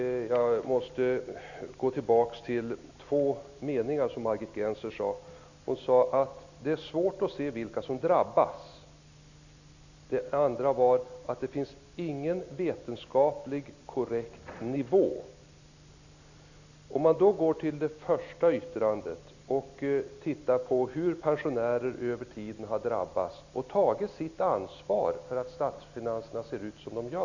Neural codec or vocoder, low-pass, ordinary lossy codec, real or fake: none; 7.2 kHz; none; real